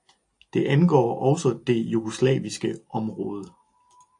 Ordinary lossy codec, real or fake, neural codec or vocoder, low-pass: AAC, 64 kbps; real; none; 10.8 kHz